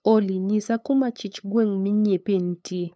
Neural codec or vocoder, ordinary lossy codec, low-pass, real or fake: codec, 16 kHz, 8 kbps, FunCodec, trained on LibriTTS, 25 frames a second; none; none; fake